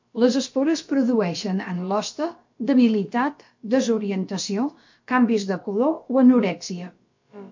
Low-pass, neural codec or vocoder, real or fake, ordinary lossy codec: 7.2 kHz; codec, 16 kHz, about 1 kbps, DyCAST, with the encoder's durations; fake; MP3, 48 kbps